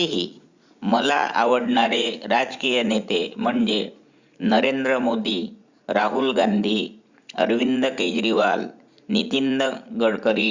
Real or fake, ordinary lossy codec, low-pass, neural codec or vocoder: fake; Opus, 64 kbps; 7.2 kHz; vocoder, 44.1 kHz, 80 mel bands, Vocos